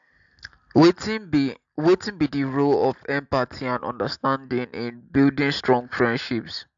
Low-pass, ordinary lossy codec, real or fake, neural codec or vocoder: 7.2 kHz; AAC, 64 kbps; real; none